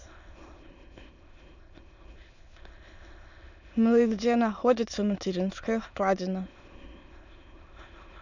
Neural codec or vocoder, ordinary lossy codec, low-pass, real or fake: autoencoder, 22.05 kHz, a latent of 192 numbers a frame, VITS, trained on many speakers; none; 7.2 kHz; fake